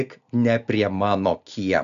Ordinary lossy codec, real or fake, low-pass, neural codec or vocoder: AAC, 96 kbps; real; 7.2 kHz; none